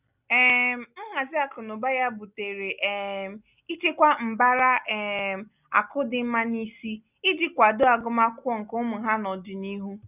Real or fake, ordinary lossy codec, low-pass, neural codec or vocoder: real; none; 3.6 kHz; none